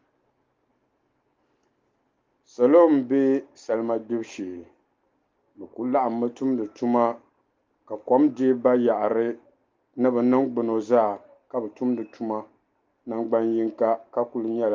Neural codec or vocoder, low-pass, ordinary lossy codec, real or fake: none; 7.2 kHz; Opus, 32 kbps; real